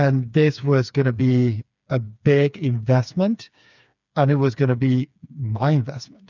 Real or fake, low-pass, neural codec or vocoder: fake; 7.2 kHz; codec, 16 kHz, 4 kbps, FreqCodec, smaller model